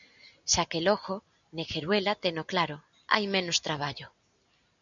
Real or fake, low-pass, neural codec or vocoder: real; 7.2 kHz; none